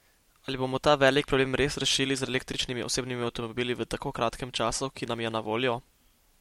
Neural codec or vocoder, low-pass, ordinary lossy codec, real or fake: none; 19.8 kHz; MP3, 64 kbps; real